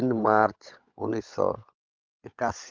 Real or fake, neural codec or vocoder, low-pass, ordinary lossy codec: fake; codec, 16 kHz, 16 kbps, FunCodec, trained on LibriTTS, 50 frames a second; 7.2 kHz; Opus, 24 kbps